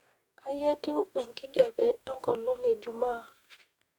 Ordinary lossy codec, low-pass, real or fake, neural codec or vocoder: none; 19.8 kHz; fake; codec, 44.1 kHz, 2.6 kbps, DAC